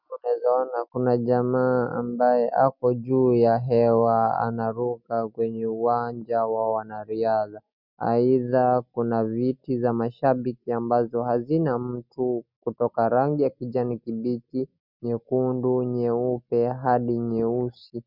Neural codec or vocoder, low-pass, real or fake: none; 5.4 kHz; real